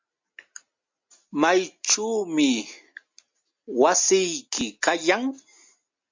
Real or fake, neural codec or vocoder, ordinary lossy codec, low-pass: real; none; MP3, 48 kbps; 7.2 kHz